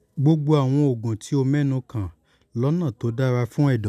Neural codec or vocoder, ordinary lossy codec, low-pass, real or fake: none; none; 14.4 kHz; real